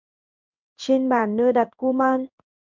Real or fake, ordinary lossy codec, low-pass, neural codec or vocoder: fake; MP3, 64 kbps; 7.2 kHz; codec, 16 kHz in and 24 kHz out, 1 kbps, XY-Tokenizer